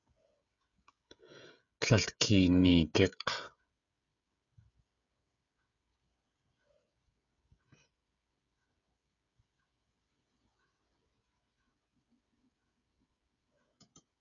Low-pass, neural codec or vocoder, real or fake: 7.2 kHz; codec, 16 kHz, 16 kbps, FreqCodec, smaller model; fake